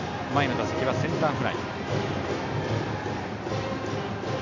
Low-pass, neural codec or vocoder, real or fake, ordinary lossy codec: 7.2 kHz; none; real; none